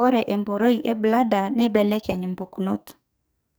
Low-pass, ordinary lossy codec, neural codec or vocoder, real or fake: none; none; codec, 44.1 kHz, 2.6 kbps, SNAC; fake